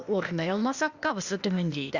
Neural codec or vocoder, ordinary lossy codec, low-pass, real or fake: codec, 16 kHz, 0.8 kbps, ZipCodec; Opus, 64 kbps; 7.2 kHz; fake